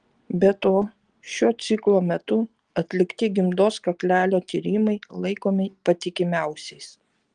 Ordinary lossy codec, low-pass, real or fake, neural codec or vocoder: Opus, 24 kbps; 9.9 kHz; real; none